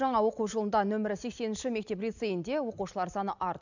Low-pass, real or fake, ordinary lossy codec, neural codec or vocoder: 7.2 kHz; real; none; none